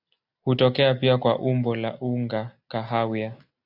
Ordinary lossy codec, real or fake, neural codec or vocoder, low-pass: AAC, 48 kbps; real; none; 5.4 kHz